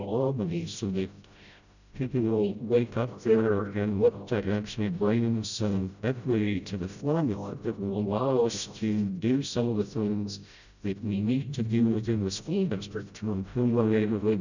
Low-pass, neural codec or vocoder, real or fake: 7.2 kHz; codec, 16 kHz, 0.5 kbps, FreqCodec, smaller model; fake